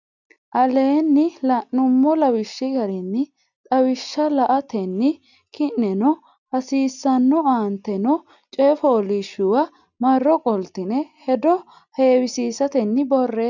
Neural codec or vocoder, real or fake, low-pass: none; real; 7.2 kHz